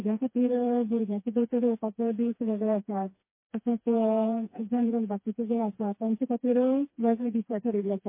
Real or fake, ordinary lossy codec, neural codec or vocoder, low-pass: fake; MP3, 24 kbps; codec, 16 kHz, 2 kbps, FreqCodec, smaller model; 3.6 kHz